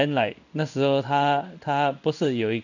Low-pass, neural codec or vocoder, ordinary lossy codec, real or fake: 7.2 kHz; codec, 16 kHz in and 24 kHz out, 1 kbps, XY-Tokenizer; none; fake